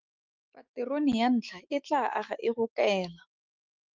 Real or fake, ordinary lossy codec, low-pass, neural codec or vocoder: fake; Opus, 24 kbps; 7.2 kHz; autoencoder, 48 kHz, 128 numbers a frame, DAC-VAE, trained on Japanese speech